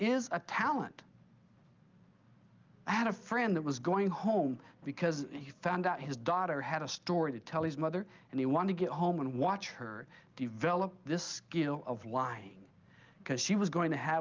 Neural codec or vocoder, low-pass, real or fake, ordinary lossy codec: none; 7.2 kHz; real; Opus, 32 kbps